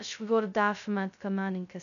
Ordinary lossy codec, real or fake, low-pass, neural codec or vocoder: none; fake; 7.2 kHz; codec, 16 kHz, 0.2 kbps, FocalCodec